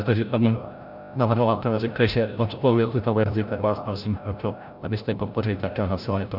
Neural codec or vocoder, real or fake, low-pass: codec, 16 kHz, 0.5 kbps, FreqCodec, larger model; fake; 5.4 kHz